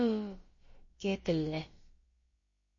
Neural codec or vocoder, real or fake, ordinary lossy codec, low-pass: codec, 16 kHz, about 1 kbps, DyCAST, with the encoder's durations; fake; MP3, 32 kbps; 7.2 kHz